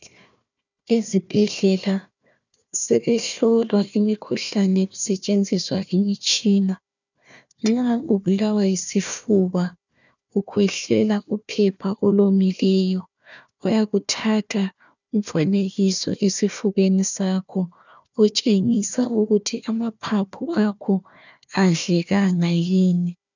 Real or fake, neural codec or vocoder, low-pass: fake; codec, 16 kHz, 1 kbps, FunCodec, trained on Chinese and English, 50 frames a second; 7.2 kHz